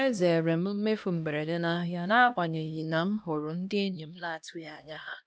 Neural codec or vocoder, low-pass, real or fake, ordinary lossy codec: codec, 16 kHz, 1 kbps, X-Codec, HuBERT features, trained on LibriSpeech; none; fake; none